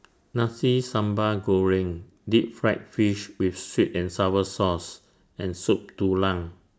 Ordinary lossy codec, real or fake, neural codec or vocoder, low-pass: none; real; none; none